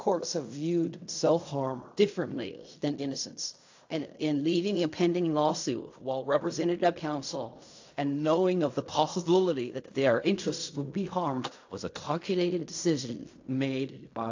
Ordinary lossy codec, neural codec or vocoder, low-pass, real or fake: AAC, 48 kbps; codec, 16 kHz in and 24 kHz out, 0.4 kbps, LongCat-Audio-Codec, fine tuned four codebook decoder; 7.2 kHz; fake